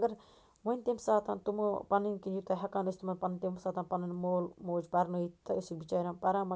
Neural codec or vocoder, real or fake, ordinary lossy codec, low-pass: none; real; none; none